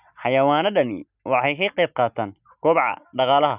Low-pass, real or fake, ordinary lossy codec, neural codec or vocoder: 3.6 kHz; real; Opus, 64 kbps; none